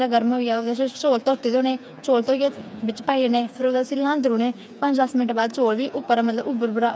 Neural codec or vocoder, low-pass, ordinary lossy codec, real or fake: codec, 16 kHz, 4 kbps, FreqCodec, smaller model; none; none; fake